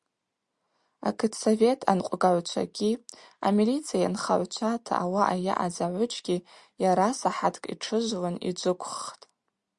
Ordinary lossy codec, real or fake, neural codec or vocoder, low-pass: Opus, 64 kbps; real; none; 10.8 kHz